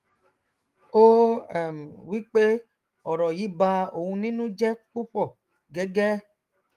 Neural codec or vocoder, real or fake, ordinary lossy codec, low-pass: autoencoder, 48 kHz, 128 numbers a frame, DAC-VAE, trained on Japanese speech; fake; Opus, 24 kbps; 14.4 kHz